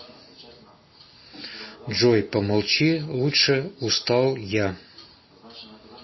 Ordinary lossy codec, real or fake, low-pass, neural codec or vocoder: MP3, 24 kbps; real; 7.2 kHz; none